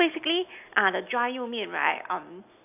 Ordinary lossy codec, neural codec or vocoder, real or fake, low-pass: AAC, 32 kbps; none; real; 3.6 kHz